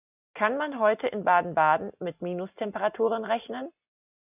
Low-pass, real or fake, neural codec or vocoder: 3.6 kHz; real; none